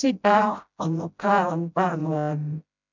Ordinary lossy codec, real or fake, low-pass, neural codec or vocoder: none; fake; 7.2 kHz; codec, 16 kHz, 0.5 kbps, FreqCodec, smaller model